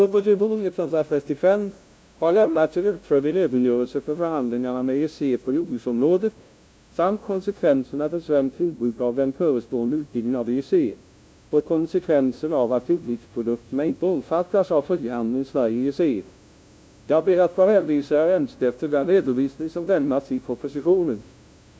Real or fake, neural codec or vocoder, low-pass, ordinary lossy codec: fake; codec, 16 kHz, 0.5 kbps, FunCodec, trained on LibriTTS, 25 frames a second; none; none